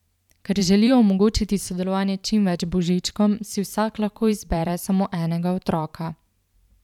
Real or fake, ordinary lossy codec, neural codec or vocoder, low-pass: fake; none; vocoder, 44.1 kHz, 128 mel bands every 512 samples, BigVGAN v2; 19.8 kHz